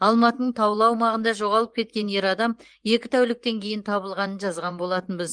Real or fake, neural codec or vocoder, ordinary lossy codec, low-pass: fake; vocoder, 24 kHz, 100 mel bands, Vocos; Opus, 24 kbps; 9.9 kHz